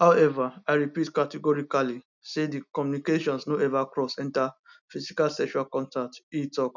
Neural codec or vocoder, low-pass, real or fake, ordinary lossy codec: none; 7.2 kHz; real; none